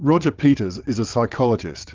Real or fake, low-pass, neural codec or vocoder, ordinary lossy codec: fake; 7.2 kHz; vocoder, 22.05 kHz, 80 mel bands, WaveNeXt; Opus, 24 kbps